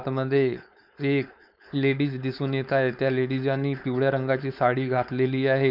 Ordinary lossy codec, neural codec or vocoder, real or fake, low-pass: none; codec, 16 kHz, 4.8 kbps, FACodec; fake; 5.4 kHz